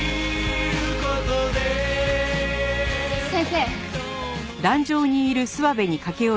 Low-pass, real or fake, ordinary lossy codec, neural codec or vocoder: none; real; none; none